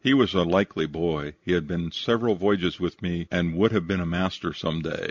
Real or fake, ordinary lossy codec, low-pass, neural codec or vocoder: real; MP3, 64 kbps; 7.2 kHz; none